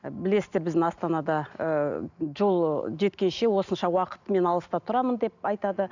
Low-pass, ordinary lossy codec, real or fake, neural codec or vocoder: 7.2 kHz; none; real; none